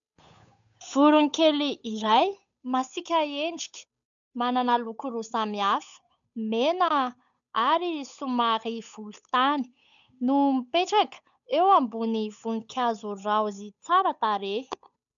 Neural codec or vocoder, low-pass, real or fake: codec, 16 kHz, 8 kbps, FunCodec, trained on Chinese and English, 25 frames a second; 7.2 kHz; fake